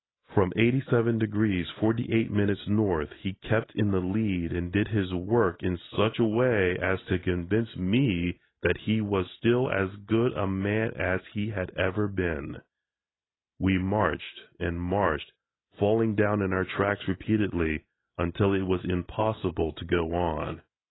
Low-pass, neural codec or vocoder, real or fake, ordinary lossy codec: 7.2 kHz; none; real; AAC, 16 kbps